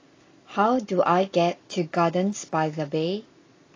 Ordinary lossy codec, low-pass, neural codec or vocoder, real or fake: AAC, 32 kbps; 7.2 kHz; none; real